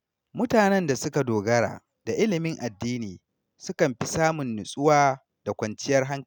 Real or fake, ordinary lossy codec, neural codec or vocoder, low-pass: real; none; none; 19.8 kHz